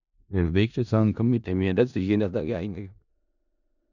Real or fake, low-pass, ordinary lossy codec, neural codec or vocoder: fake; 7.2 kHz; none; codec, 16 kHz in and 24 kHz out, 0.4 kbps, LongCat-Audio-Codec, four codebook decoder